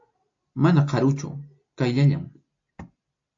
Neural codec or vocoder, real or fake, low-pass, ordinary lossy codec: none; real; 7.2 kHz; AAC, 48 kbps